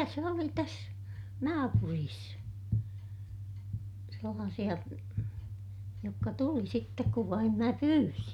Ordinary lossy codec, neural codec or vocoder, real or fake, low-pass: none; none; real; 19.8 kHz